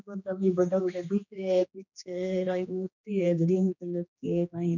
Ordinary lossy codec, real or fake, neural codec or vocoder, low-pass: none; fake; codec, 16 kHz, 2 kbps, X-Codec, HuBERT features, trained on general audio; 7.2 kHz